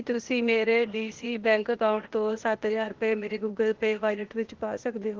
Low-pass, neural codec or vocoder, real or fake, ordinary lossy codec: 7.2 kHz; codec, 16 kHz, 0.8 kbps, ZipCodec; fake; Opus, 16 kbps